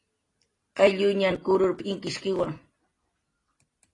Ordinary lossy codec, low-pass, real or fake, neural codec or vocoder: AAC, 32 kbps; 10.8 kHz; real; none